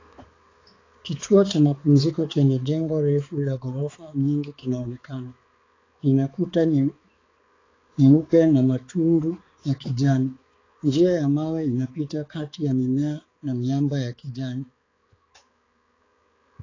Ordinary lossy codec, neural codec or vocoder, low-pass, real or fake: AAC, 32 kbps; codec, 16 kHz, 4 kbps, X-Codec, HuBERT features, trained on balanced general audio; 7.2 kHz; fake